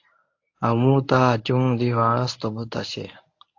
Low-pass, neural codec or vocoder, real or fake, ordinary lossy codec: 7.2 kHz; codec, 24 kHz, 0.9 kbps, WavTokenizer, medium speech release version 1; fake; AAC, 48 kbps